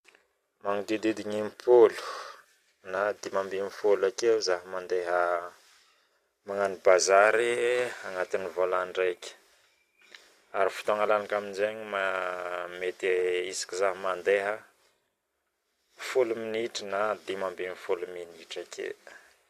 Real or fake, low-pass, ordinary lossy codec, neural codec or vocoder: real; 14.4 kHz; AAC, 64 kbps; none